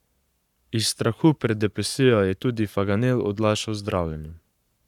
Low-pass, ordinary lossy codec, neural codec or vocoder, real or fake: 19.8 kHz; none; codec, 44.1 kHz, 7.8 kbps, Pupu-Codec; fake